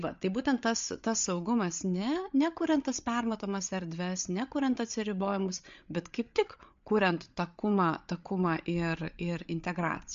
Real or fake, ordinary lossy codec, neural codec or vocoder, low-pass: fake; MP3, 48 kbps; codec, 16 kHz, 8 kbps, FreqCodec, larger model; 7.2 kHz